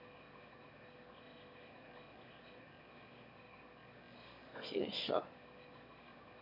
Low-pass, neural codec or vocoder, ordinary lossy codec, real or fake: 5.4 kHz; autoencoder, 22.05 kHz, a latent of 192 numbers a frame, VITS, trained on one speaker; none; fake